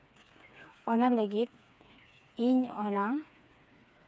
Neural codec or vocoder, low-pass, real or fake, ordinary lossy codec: codec, 16 kHz, 4 kbps, FreqCodec, smaller model; none; fake; none